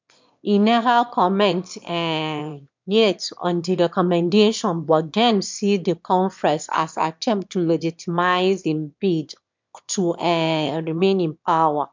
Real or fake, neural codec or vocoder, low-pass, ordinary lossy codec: fake; autoencoder, 22.05 kHz, a latent of 192 numbers a frame, VITS, trained on one speaker; 7.2 kHz; MP3, 64 kbps